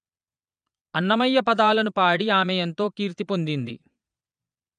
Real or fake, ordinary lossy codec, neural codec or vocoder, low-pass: fake; none; vocoder, 22.05 kHz, 80 mel bands, Vocos; 9.9 kHz